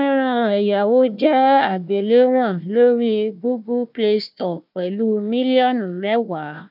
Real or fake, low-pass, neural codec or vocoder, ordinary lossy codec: fake; 5.4 kHz; codec, 16 kHz, 1 kbps, FunCodec, trained on Chinese and English, 50 frames a second; none